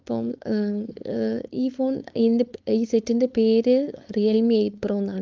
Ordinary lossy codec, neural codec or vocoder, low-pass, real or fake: Opus, 32 kbps; codec, 16 kHz, 4.8 kbps, FACodec; 7.2 kHz; fake